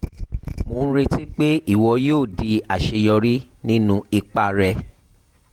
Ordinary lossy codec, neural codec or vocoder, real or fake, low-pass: Opus, 24 kbps; vocoder, 48 kHz, 128 mel bands, Vocos; fake; 19.8 kHz